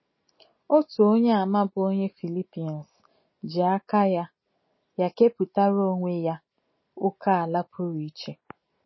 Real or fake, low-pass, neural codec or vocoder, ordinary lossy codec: real; 7.2 kHz; none; MP3, 24 kbps